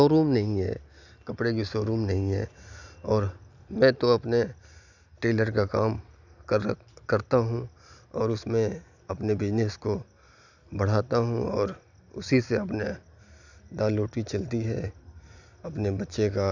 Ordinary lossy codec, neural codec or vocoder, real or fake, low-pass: none; none; real; 7.2 kHz